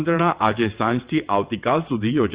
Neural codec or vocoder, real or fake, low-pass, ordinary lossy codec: vocoder, 22.05 kHz, 80 mel bands, WaveNeXt; fake; 3.6 kHz; Opus, 64 kbps